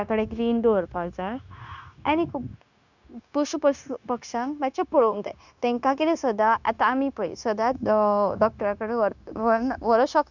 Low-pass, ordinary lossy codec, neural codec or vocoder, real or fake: 7.2 kHz; none; codec, 16 kHz, 0.9 kbps, LongCat-Audio-Codec; fake